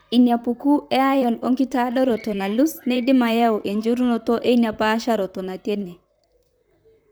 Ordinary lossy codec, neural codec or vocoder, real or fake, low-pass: none; vocoder, 44.1 kHz, 128 mel bands, Pupu-Vocoder; fake; none